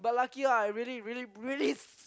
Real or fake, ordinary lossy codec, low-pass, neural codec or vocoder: real; none; none; none